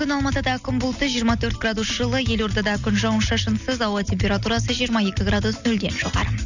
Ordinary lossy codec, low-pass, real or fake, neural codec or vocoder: none; 7.2 kHz; real; none